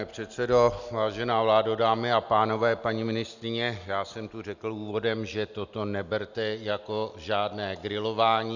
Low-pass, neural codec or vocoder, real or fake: 7.2 kHz; none; real